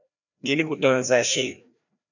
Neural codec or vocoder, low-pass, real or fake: codec, 16 kHz, 1 kbps, FreqCodec, larger model; 7.2 kHz; fake